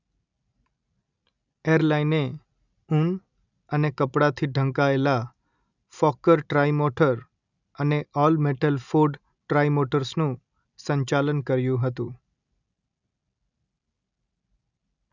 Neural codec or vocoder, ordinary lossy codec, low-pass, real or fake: none; none; 7.2 kHz; real